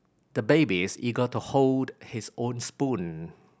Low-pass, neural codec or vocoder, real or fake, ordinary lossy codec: none; none; real; none